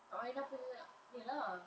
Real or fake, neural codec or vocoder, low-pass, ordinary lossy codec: real; none; none; none